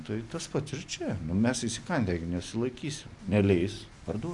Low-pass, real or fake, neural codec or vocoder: 10.8 kHz; real; none